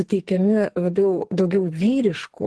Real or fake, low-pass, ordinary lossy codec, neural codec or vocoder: fake; 10.8 kHz; Opus, 16 kbps; codec, 44.1 kHz, 2.6 kbps, SNAC